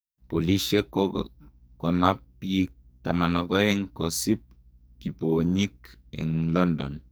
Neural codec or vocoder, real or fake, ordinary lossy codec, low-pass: codec, 44.1 kHz, 2.6 kbps, SNAC; fake; none; none